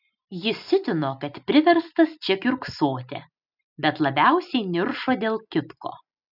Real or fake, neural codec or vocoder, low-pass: real; none; 5.4 kHz